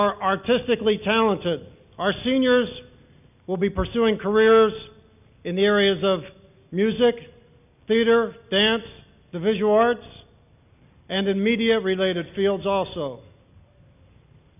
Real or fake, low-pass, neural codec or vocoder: real; 3.6 kHz; none